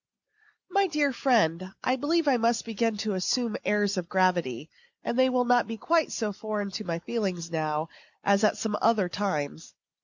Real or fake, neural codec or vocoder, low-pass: real; none; 7.2 kHz